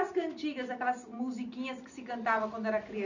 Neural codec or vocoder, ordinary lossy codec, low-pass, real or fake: none; none; 7.2 kHz; real